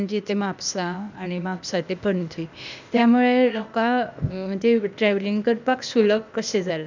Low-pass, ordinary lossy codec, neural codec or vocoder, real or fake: 7.2 kHz; none; codec, 16 kHz, 0.8 kbps, ZipCodec; fake